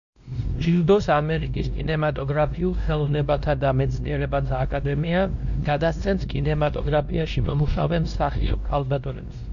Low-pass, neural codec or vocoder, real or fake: 7.2 kHz; codec, 16 kHz, 1 kbps, X-Codec, WavLM features, trained on Multilingual LibriSpeech; fake